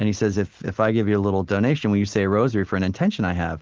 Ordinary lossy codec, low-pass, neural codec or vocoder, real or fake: Opus, 16 kbps; 7.2 kHz; none; real